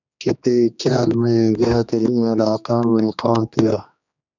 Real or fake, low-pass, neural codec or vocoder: fake; 7.2 kHz; codec, 16 kHz, 2 kbps, X-Codec, HuBERT features, trained on general audio